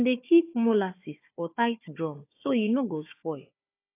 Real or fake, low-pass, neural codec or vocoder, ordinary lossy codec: fake; 3.6 kHz; codec, 16 kHz, 4 kbps, FunCodec, trained on Chinese and English, 50 frames a second; AAC, 24 kbps